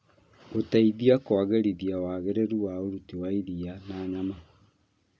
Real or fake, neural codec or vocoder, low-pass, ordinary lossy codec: real; none; none; none